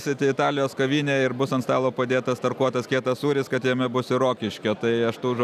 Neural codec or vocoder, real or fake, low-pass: none; real; 14.4 kHz